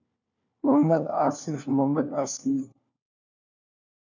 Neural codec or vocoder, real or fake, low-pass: codec, 16 kHz, 1 kbps, FunCodec, trained on LibriTTS, 50 frames a second; fake; 7.2 kHz